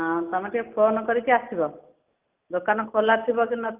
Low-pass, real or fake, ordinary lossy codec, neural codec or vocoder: 3.6 kHz; real; Opus, 32 kbps; none